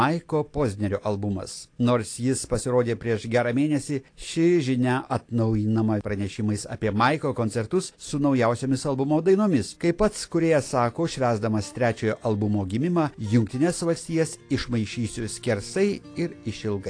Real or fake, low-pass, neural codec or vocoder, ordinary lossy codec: real; 9.9 kHz; none; AAC, 48 kbps